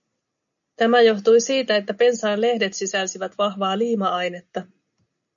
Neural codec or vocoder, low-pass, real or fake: none; 7.2 kHz; real